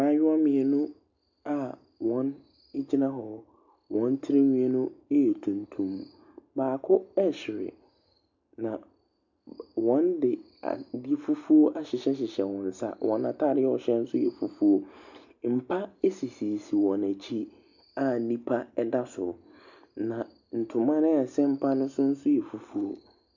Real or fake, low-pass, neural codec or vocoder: real; 7.2 kHz; none